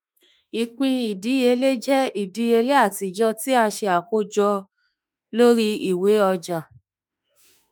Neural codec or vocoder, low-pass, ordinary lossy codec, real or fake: autoencoder, 48 kHz, 32 numbers a frame, DAC-VAE, trained on Japanese speech; none; none; fake